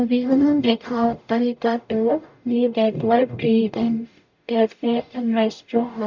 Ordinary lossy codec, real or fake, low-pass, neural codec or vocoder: none; fake; 7.2 kHz; codec, 44.1 kHz, 0.9 kbps, DAC